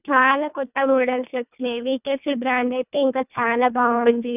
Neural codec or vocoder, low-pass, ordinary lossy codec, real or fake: codec, 24 kHz, 1.5 kbps, HILCodec; 3.6 kHz; none; fake